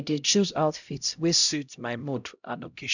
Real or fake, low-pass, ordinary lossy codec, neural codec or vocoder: fake; 7.2 kHz; none; codec, 16 kHz, 0.5 kbps, X-Codec, HuBERT features, trained on LibriSpeech